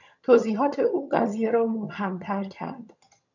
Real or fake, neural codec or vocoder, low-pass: fake; vocoder, 22.05 kHz, 80 mel bands, HiFi-GAN; 7.2 kHz